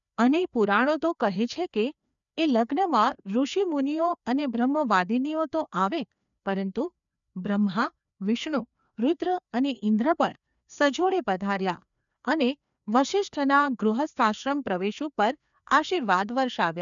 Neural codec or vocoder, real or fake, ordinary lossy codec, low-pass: codec, 16 kHz, 2 kbps, FreqCodec, larger model; fake; none; 7.2 kHz